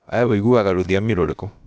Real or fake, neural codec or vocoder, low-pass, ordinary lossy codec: fake; codec, 16 kHz, about 1 kbps, DyCAST, with the encoder's durations; none; none